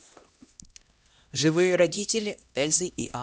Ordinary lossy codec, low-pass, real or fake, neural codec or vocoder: none; none; fake; codec, 16 kHz, 1 kbps, X-Codec, HuBERT features, trained on LibriSpeech